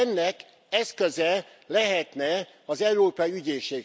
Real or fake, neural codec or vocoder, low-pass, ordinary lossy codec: real; none; none; none